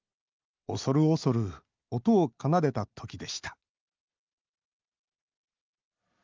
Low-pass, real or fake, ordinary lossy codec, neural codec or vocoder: 7.2 kHz; real; Opus, 24 kbps; none